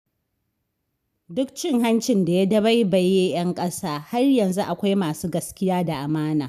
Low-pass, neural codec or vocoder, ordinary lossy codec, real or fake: 14.4 kHz; none; none; real